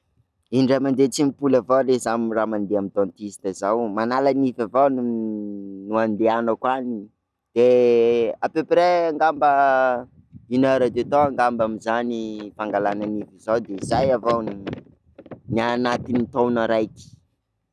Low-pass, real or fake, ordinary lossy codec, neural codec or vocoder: none; real; none; none